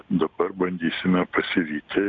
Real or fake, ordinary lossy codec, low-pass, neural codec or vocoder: real; MP3, 96 kbps; 7.2 kHz; none